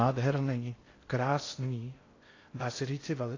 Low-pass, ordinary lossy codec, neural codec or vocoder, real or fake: 7.2 kHz; AAC, 32 kbps; codec, 16 kHz in and 24 kHz out, 0.6 kbps, FocalCodec, streaming, 2048 codes; fake